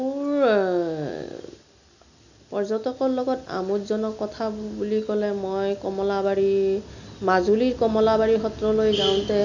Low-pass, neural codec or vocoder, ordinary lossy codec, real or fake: 7.2 kHz; none; none; real